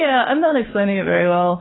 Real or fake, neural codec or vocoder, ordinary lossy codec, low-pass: fake; codec, 16 kHz, 4 kbps, FunCodec, trained on LibriTTS, 50 frames a second; AAC, 16 kbps; 7.2 kHz